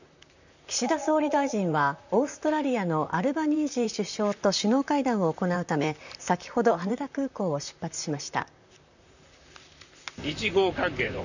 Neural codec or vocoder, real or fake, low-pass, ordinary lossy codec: vocoder, 44.1 kHz, 128 mel bands, Pupu-Vocoder; fake; 7.2 kHz; none